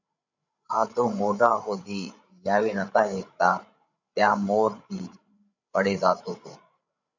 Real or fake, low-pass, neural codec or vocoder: fake; 7.2 kHz; codec, 16 kHz, 16 kbps, FreqCodec, larger model